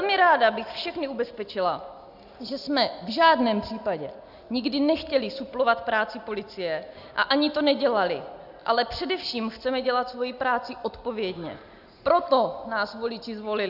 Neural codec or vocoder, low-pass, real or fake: none; 5.4 kHz; real